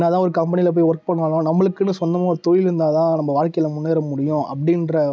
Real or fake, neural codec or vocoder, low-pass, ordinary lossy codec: real; none; none; none